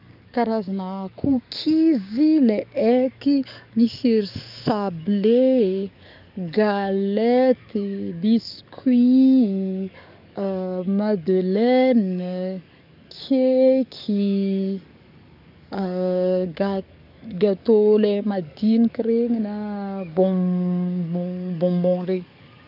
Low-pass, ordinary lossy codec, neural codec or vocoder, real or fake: 5.4 kHz; none; codec, 44.1 kHz, 7.8 kbps, DAC; fake